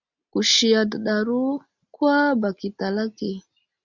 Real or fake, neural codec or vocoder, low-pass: real; none; 7.2 kHz